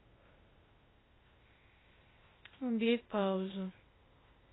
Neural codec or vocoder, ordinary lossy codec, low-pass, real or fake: codec, 16 kHz, 0.8 kbps, ZipCodec; AAC, 16 kbps; 7.2 kHz; fake